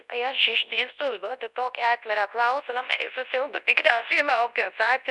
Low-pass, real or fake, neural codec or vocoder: 10.8 kHz; fake; codec, 24 kHz, 0.9 kbps, WavTokenizer, large speech release